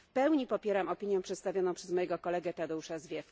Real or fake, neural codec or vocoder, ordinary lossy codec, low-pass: real; none; none; none